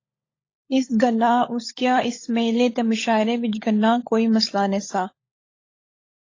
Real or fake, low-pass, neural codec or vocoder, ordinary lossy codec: fake; 7.2 kHz; codec, 16 kHz, 16 kbps, FunCodec, trained on LibriTTS, 50 frames a second; AAC, 32 kbps